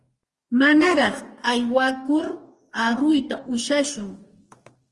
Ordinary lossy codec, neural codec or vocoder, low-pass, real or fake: Opus, 24 kbps; codec, 44.1 kHz, 2.6 kbps, DAC; 10.8 kHz; fake